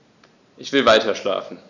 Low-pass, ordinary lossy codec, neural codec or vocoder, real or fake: 7.2 kHz; none; none; real